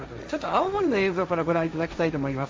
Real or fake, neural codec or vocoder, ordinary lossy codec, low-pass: fake; codec, 16 kHz, 1.1 kbps, Voila-Tokenizer; none; none